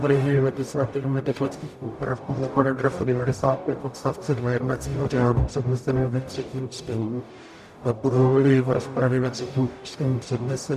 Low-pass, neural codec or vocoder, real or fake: 14.4 kHz; codec, 44.1 kHz, 0.9 kbps, DAC; fake